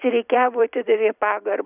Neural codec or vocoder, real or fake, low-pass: vocoder, 44.1 kHz, 128 mel bands every 256 samples, BigVGAN v2; fake; 3.6 kHz